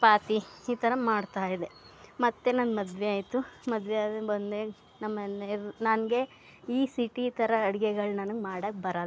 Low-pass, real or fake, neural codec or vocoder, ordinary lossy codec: none; real; none; none